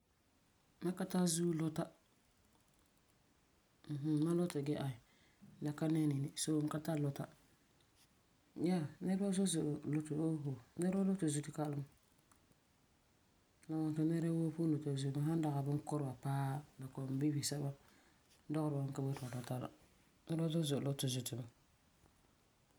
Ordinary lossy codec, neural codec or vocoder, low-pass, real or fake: none; none; none; real